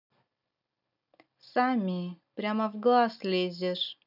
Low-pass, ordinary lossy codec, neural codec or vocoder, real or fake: 5.4 kHz; none; none; real